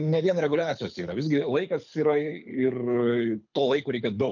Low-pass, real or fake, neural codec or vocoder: 7.2 kHz; fake; codec, 24 kHz, 6 kbps, HILCodec